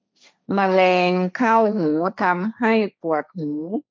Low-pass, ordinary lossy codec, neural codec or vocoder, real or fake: none; none; codec, 16 kHz, 1.1 kbps, Voila-Tokenizer; fake